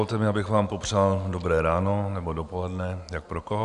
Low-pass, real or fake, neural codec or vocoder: 10.8 kHz; real; none